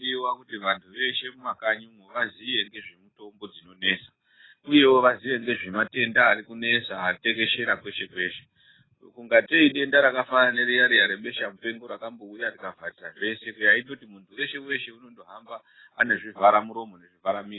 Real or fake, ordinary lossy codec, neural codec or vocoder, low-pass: real; AAC, 16 kbps; none; 7.2 kHz